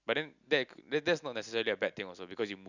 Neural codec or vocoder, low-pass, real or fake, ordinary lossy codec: none; 7.2 kHz; real; none